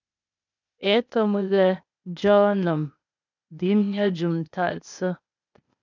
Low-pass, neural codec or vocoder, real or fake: 7.2 kHz; codec, 16 kHz, 0.8 kbps, ZipCodec; fake